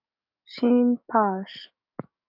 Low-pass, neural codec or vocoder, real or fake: 5.4 kHz; codec, 44.1 kHz, 7.8 kbps, DAC; fake